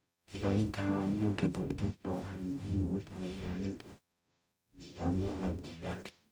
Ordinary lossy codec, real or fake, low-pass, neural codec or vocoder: none; fake; none; codec, 44.1 kHz, 0.9 kbps, DAC